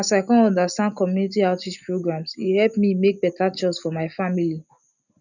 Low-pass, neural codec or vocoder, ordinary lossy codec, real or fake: 7.2 kHz; none; none; real